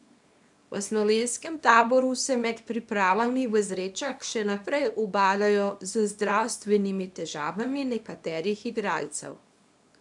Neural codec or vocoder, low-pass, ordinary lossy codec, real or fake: codec, 24 kHz, 0.9 kbps, WavTokenizer, small release; 10.8 kHz; none; fake